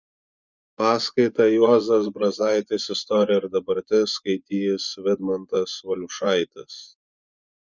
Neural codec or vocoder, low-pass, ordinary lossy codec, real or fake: vocoder, 24 kHz, 100 mel bands, Vocos; 7.2 kHz; Opus, 64 kbps; fake